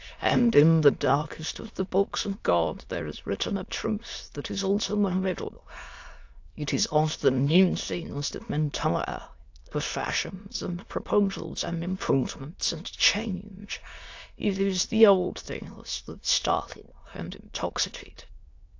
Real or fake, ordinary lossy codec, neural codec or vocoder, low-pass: fake; AAC, 48 kbps; autoencoder, 22.05 kHz, a latent of 192 numbers a frame, VITS, trained on many speakers; 7.2 kHz